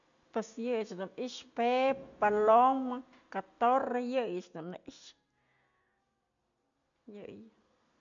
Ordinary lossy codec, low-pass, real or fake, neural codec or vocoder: none; 7.2 kHz; real; none